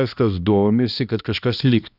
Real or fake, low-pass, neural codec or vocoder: fake; 5.4 kHz; codec, 16 kHz, 1 kbps, X-Codec, HuBERT features, trained on balanced general audio